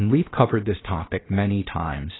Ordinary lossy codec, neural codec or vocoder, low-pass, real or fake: AAC, 16 kbps; codec, 16 kHz, 0.7 kbps, FocalCodec; 7.2 kHz; fake